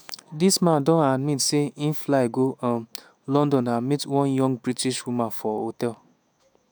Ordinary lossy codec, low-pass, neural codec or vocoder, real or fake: none; none; autoencoder, 48 kHz, 128 numbers a frame, DAC-VAE, trained on Japanese speech; fake